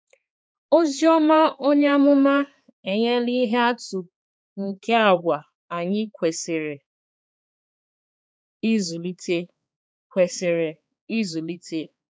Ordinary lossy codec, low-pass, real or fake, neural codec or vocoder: none; none; fake; codec, 16 kHz, 4 kbps, X-Codec, HuBERT features, trained on balanced general audio